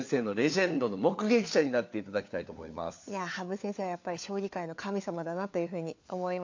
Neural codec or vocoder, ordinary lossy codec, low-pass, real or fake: codec, 16 kHz, 4 kbps, FunCodec, trained on LibriTTS, 50 frames a second; AAC, 48 kbps; 7.2 kHz; fake